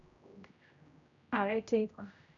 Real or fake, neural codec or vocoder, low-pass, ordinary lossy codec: fake; codec, 16 kHz, 0.5 kbps, X-Codec, HuBERT features, trained on general audio; 7.2 kHz; none